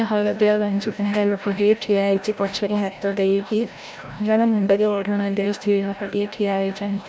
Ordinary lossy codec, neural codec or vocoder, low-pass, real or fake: none; codec, 16 kHz, 0.5 kbps, FreqCodec, larger model; none; fake